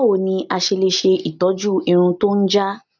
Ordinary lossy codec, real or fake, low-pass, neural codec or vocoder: none; real; 7.2 kHz; none